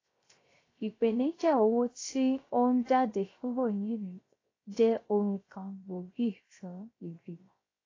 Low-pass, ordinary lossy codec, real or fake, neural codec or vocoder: 7.2 kHz; AAC, 32 kbps; fake; codec, 16 kHz, 0.3 kbps, FocalCodec